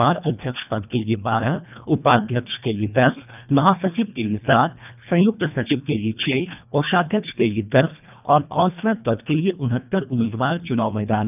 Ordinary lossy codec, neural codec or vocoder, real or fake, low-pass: none; codec, 24 kHz, 1.5 kbps, HILCodec; fake; 3.6 kHz